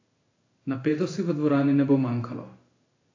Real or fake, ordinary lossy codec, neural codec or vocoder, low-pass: fake; AAC, 32 kbps; codec, 16 kHz in and 24 kHz out, 1 kbps, XY-Tokenizer; 7.2 kHz